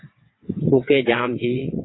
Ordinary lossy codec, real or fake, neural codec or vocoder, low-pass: AAC, 16 kbps; fake; vocoder, 22.05 kHz, 80 mel bands, WaveNeXt; 7.2 kHz